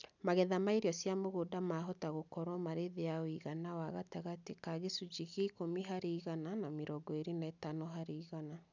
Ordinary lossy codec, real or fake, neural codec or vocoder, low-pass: none; real; none; 7.2 kHz